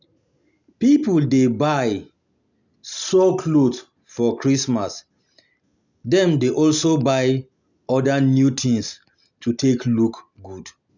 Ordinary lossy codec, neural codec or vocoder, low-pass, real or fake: none; none; 7.2 kHz; real